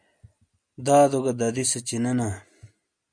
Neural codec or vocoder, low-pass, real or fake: none; 9.9 kHz; real